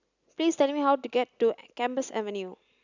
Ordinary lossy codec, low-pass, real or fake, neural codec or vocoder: none; 7.2 kHz; real; none